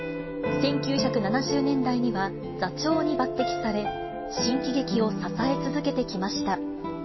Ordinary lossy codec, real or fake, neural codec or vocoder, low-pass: MP3, 24 kbps; real; none; 7.2 kHz